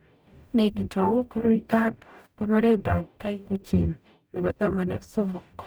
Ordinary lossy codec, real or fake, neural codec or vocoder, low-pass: none; fake; codec, 44.1 kHz, 0.9 kbps, DAC; none